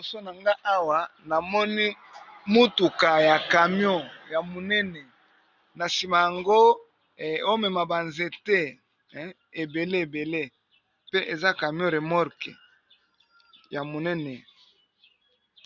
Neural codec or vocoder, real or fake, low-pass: none; real; 7.2 kHz